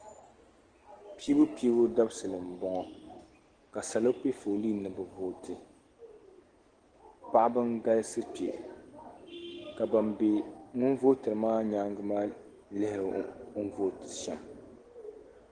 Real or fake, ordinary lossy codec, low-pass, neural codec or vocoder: real; Opus, 16 kbps; 9.9 kHz; none